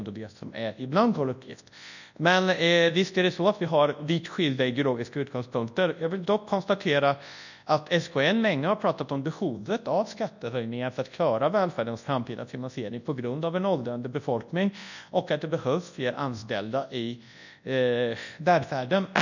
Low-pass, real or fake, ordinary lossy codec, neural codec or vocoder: 7.2 kHz; fake; none; codec, 24 kHz, 0.9 kbps, WavTokenizer, large speech release